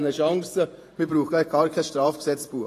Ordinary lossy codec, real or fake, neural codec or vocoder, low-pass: AAC, 48 kbps; fake; vocoder, 48 kHz, 128 mel bands, Vocos; 14.4 kHz